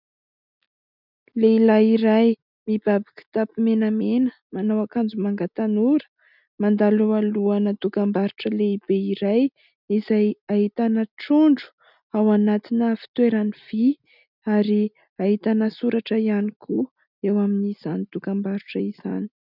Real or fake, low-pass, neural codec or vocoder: real; 5.4 kHz; none